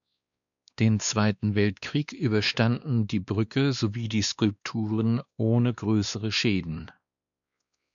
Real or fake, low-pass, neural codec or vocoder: fake; 7.2 kHz; codec, 16 kHz, 2 kbps, X-Codec, WavLM features, trained on Multilingual LibriSpeech